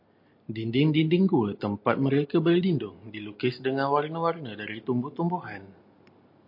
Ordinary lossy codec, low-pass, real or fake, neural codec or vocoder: MP3, 32 kbps; 5.4 kHz; real; none